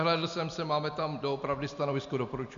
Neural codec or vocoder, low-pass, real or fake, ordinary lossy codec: none; 7.2 kHz; real; MP3, 64 kbps